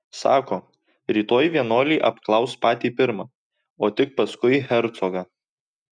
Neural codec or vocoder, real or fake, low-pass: none; real; 9.9 kHz